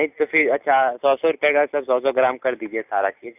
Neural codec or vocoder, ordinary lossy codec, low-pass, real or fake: none; none; 3.6 kHz; real